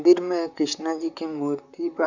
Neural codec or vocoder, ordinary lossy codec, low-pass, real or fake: vocoder, 44.1 kHz, 128 mel bands, Pupu-Vocoder; none; 7.2 kHz; fake